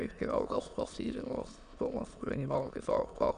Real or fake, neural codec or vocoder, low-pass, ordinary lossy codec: fake; autoencoder, 22.05 kHz, a latent of 192 numbers a frame, VITS, trained on many speakers; 9.9 kHz; none